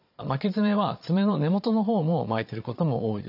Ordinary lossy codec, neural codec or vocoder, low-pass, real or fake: none; vocoder, 22.05 kHz, 80 mel bands, Vocos; 5.4 kHz; fake